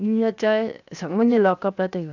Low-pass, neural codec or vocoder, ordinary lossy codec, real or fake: 7.2 kHz; codec, 16 kHz, 0.7 kbps, FocalCodec; none; fake